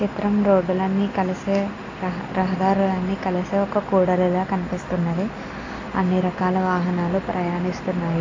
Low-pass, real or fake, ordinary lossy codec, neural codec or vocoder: 7.2 kHz; real; AAC, 32 kbps; none